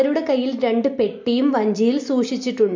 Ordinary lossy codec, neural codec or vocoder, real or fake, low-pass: MP3, 48 kbps; none; real; 7.2 kHz